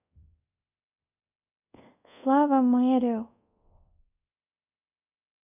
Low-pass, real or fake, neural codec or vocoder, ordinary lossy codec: 3.6 kHz; fake; codec, 24 kHz, 0.5 kbps, DualCodec; none